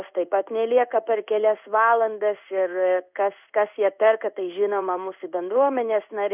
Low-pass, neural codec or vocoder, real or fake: 3.6 kHz; codec, 16 kHz in and 24 kHz out, 1 kbps, XY-Tokenizer; fake